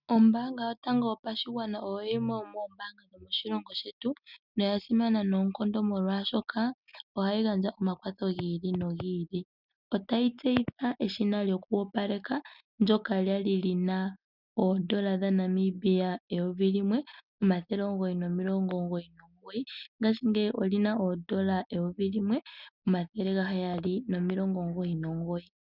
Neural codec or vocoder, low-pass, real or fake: none; 5.4 kHz; real